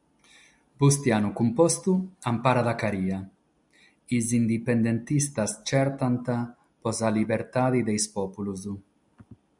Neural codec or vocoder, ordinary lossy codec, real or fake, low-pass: none; MP3, 96 kbps; real; 10.8 kHz